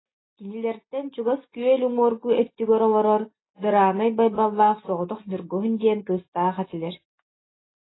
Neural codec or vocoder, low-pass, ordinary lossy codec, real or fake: none; 7.2 kHz; AAC, 16 kbps; real